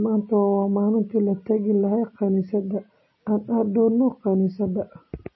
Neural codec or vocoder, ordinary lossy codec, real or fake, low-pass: none; MP3, 24 kbps; real; 7.2 kHz